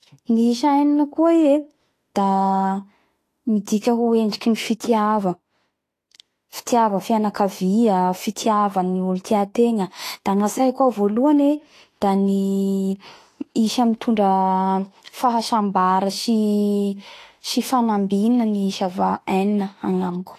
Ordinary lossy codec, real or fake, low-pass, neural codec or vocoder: AAC, 48 kbps; fake; 14.4 kHz; autoencoder, 48 kHz, 32 numbers a frame, DAC-VAE, trained on Japanese speech